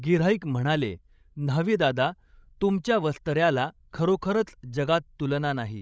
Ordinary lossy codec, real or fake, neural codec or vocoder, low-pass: none; fake; codec, 16 kHz, 16 kbps, FreqCodec, larger model; none